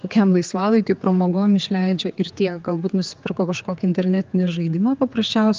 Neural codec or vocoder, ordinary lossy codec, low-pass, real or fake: codec, 16 kHz, 4 kbps, X-Codec, HuBERT features, trained on general audio; Opus, 32 kbps; 7.2 kHz; fake